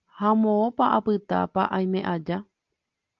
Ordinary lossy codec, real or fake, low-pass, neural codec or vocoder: Opus, 24 kbps; real; 7.2 kHz; none